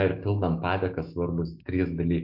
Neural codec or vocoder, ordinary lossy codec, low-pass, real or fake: autoencoder, 48 kHz, 128 numbers a frame, DAC-VAE, trained on Japanese speech; MP3, 48 kbps; 5.4 kHz; fake